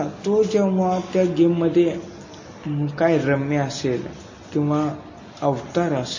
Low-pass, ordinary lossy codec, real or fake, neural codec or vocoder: 7.2 kHz; MP3, 32 kbps; real; none